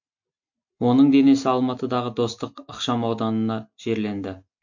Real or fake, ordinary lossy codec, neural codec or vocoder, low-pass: real; MP3, 48 kbps; none; 7.2 kHz